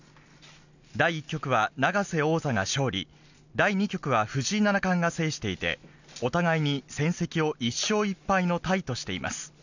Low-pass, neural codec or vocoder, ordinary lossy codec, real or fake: 7.2 kHz; none; none; real